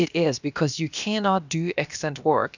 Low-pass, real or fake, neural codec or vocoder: 7.2 kHz; fake; codec, 16 kHz, about 1 kbps, DyCAST, with the encoder's durations